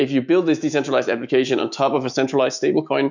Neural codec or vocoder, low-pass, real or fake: autoencoder, 48 kHz, 128 numbers a frame, DAC-VAE, trained on Japanese speech; 7.2 kHz; fake